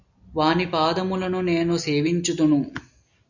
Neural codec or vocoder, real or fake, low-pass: none; real; 7.2 kHz